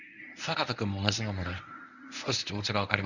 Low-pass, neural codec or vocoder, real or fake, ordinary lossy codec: 7.2 kHz; codec, 24 kHz, 0.9 kbps, WavTokenizer, medium speech release version 1; fake; none